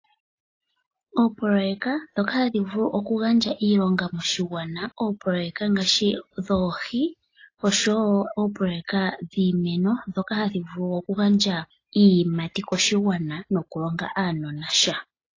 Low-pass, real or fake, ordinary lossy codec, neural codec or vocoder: 7.2 kHz; real; AAC, 32 kbps; none